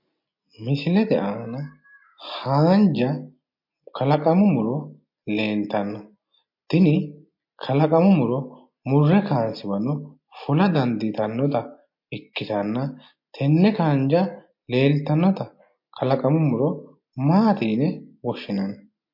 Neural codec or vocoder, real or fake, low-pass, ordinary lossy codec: none; real; 5.4 kHz; MP3, 32 kbps